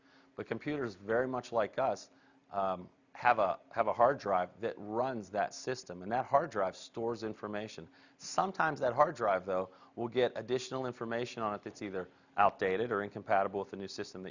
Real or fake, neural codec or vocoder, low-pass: real; none; 7.2 kHz